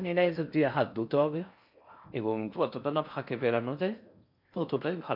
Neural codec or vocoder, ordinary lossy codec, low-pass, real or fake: codec, 16 kHz in and 24 kHz out, 0.6 kbps, FocalCodec, streaming, 4096 codes; MP3, 48 kbps; 5.4 kHz; fake